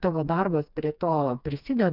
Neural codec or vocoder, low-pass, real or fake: codec, 16 kHz, 2 kbps, FreqCodec, smaller model; 5.4 kHz; fake